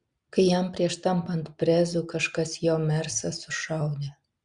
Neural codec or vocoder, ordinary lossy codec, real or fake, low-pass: none; Opus, 64 kbps; real; 9.9 kHz